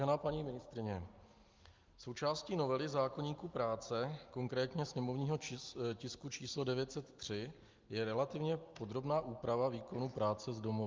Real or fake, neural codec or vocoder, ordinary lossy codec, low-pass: real; none; Opus, 32 kbps; 7.2 kHz